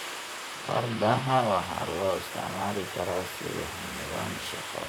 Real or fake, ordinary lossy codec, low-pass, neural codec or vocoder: fake; none; none; vocoder, 44.1 kHz, 128 mel bands, Pupu-Vocoder